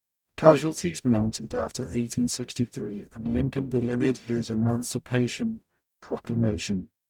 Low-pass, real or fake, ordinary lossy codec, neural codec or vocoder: 19.8 kHz; fake; none; codec, 44.1 kHz, 0.9 kbps, DAC